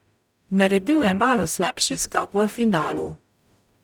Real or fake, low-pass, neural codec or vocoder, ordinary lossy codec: fake; 19.8 kHz; codec, 44.1 kHz, 0.9 kbps, DAC; none